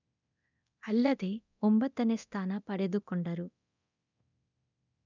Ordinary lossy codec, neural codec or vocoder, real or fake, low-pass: none; codec, 24 kHz, 0.9 kbps, DualCodec; fake; 7.2 kHz